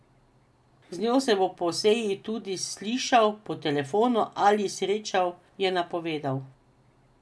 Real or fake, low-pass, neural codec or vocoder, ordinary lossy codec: real; none; none; none